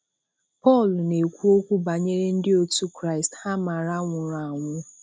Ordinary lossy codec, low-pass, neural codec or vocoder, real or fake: none; none; none; real